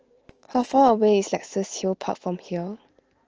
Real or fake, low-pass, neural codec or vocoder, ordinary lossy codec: fake; 7.2 kHz; autoencoder, 48 kHz, 128 numbers a frame, DAC-VAE, trained on Japanese speech; Opus, 16 kbps